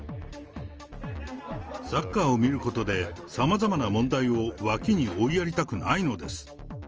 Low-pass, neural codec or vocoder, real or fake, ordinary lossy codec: 7.2 kHz; none; real; Opus, 24 kbps